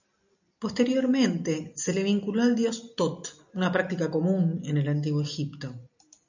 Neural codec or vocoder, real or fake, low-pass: none; real; 7.2 kHz